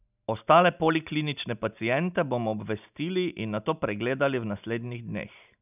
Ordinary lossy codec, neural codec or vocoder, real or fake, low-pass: none; none; real; 3.6 kHz